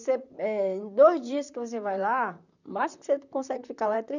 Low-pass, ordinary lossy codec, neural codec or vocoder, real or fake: 7.2 kHz; none; vocoder, 44.1 kHz, 128 mel bands, Pupu-Vocoder; fake